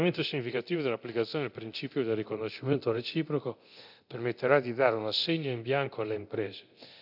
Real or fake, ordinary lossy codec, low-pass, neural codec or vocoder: fake; none; 5.4 kHz; codec, 24 kHz, 0.9 kbps, DualCodec